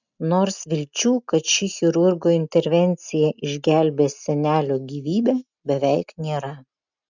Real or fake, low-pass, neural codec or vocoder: real; 7.2 kHz; none